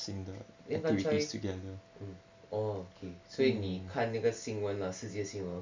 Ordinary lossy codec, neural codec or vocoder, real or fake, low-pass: MP3, 64 kbps; none; real; 7.2 kHz